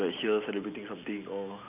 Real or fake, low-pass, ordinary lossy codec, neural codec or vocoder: real; 3.6 kHz; none; none